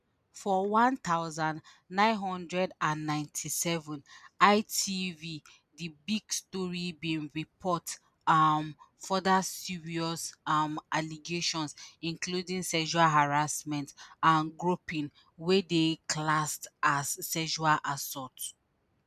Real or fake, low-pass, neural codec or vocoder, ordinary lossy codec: real; 14.4 kHz; none; none